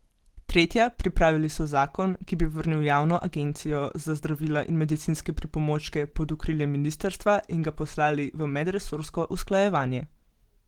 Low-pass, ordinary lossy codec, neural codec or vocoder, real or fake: 19.8 kHz; Opus, 16 kbps; none; real